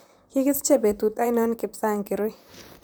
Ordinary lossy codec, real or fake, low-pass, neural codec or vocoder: none; fake; none; vocoder, 44.1 kHz, 128 mel bands, Pupu-Vocoder